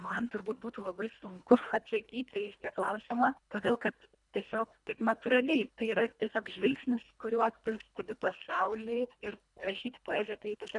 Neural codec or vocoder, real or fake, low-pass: codec, 24 kHz, 1.5 kbps, HILCodec; fake; 10.8 kHz